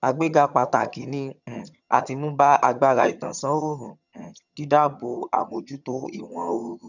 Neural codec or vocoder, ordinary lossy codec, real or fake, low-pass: vocoder, 22.05 kHz, 80 mel bands, HiFi-GAN; none; fake; 7.2 kHz